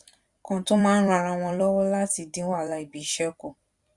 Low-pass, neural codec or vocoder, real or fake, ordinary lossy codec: 10.8 kHz; vocoder, 44.1 kHz, 128 mel bands every 256 samples, BigVGAN v2; fake; Opus, 64 kbps